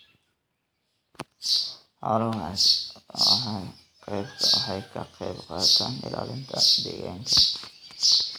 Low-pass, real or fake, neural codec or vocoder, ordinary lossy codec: none; real; none; none